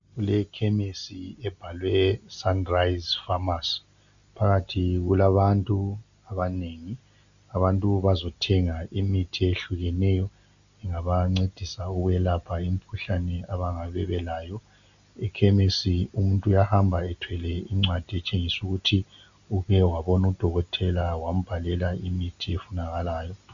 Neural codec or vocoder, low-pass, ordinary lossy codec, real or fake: none; 7.2 kHz; MP3, 96 kbps; real